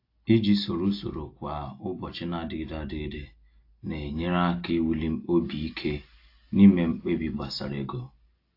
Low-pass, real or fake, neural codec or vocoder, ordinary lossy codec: 5.4 kHz; real; none; AAC, 32 kbps